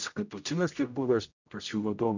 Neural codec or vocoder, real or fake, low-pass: codec, 16 kHz, 0.5 kbps, X-Codec, HuBERT features, trained on general audio; fake; 7.2 kHz